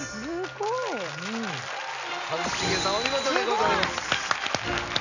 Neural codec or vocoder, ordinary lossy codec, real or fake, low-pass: none; none; real; 7.2 kHz